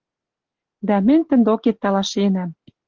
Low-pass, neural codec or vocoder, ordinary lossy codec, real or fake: 7.2 kHz; none; Opus, 16 kbps; real